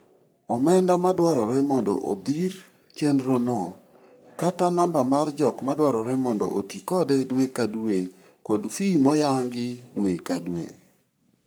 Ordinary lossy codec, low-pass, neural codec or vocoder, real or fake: none; none; codec, 44.1 kHz, 3.4 kbps, Pupu-Codec; fake